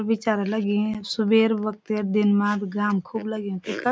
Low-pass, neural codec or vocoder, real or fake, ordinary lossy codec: none; none; real; none